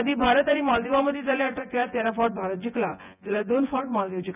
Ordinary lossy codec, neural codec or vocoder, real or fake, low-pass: none; vocoder, 24 kHz, 100 mel bands, Vocos; fake; 3.6 kHz